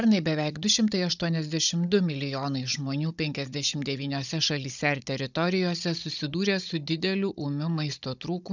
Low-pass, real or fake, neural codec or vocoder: 7.2 kHz; fake; codec, 16 kHz, 16 kbps, FunCodec, trained on Chinese and English, 50 frames a second